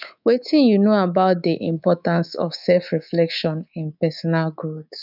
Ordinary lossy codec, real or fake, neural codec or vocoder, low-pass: none; fake; codec, 24 kHz, 3.1 kbps, DualCodec; 5.4 kHz